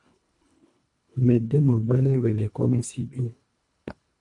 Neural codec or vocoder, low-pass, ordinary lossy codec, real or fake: codec, 24 kHz, 1.5 kbps, HILCodec; 10.8 kHz; MP3, 64 kbps; fake